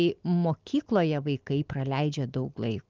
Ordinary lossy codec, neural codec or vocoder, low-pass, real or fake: Opus, 32 kbps; none; 7.2 kHz; real